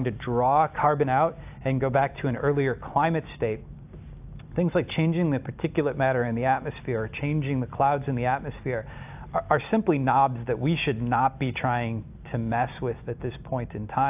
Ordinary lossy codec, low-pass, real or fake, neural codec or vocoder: AAC, 32 kbps; 3.6 kHz; real; none